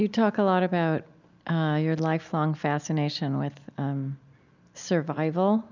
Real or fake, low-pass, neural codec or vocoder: real; 7.2 kHz; none